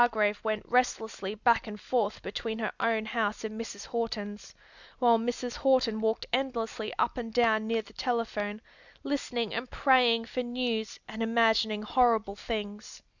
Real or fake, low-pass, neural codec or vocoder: real; 7.2 kHz; none